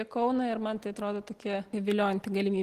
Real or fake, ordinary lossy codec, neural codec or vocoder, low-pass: real; Opus, 16 kbps; none; 14.4 kHz